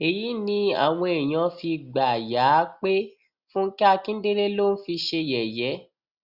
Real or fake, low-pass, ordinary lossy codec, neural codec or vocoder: real; 5.4 kHz; Opus, 64 kbps; none